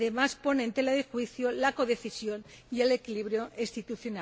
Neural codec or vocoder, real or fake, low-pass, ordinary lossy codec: none; real; none; none